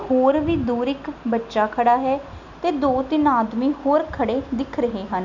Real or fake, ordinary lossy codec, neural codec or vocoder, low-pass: real; none; none; 7.2 kHz